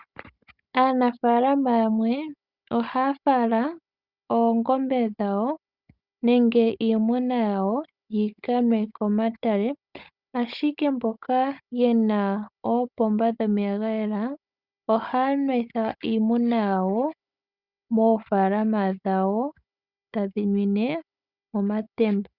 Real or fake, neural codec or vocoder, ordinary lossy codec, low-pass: fake; codec, 16 kHz, 16 kbps, FunCodec, trained on Chinese and English, 50 frames a second; Opus, 64 kbps; 5.4 kHz